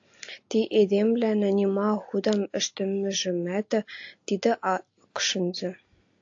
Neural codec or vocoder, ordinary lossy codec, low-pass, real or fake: none; AAC, 48 kbps; 7.2 kHz; real